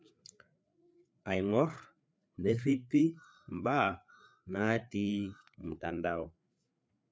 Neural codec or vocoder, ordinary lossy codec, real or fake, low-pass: codec, 16 kHz, 4 kbps, FreqCodec, larger model; none; fake; none